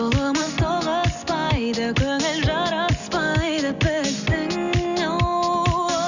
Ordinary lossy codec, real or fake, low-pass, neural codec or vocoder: none; real; 7.2 kHz; none